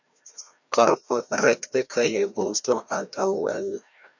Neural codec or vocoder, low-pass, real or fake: codec, 16 kHz, 1 kbps, FreqCodec, larger model; 7.2 kHz; fake